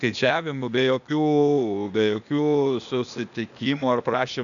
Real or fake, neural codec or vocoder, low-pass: fake; codec, 16 kHz, 0.8 kbps, ZipCodec; 7.2 kHz